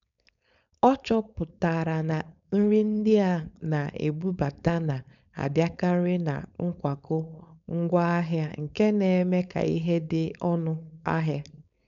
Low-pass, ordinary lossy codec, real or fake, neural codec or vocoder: 7.2 kHz; none; fake; codec, 16 kHz, 4.8 kbps, FACodec